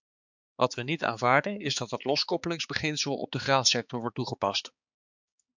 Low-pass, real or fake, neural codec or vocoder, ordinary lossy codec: 7.2 kHz; fake; codec, 16 kHz, 4 kbps, X-Codec, HuBERT features, trained on balanced general audio; MP3, 64 kbps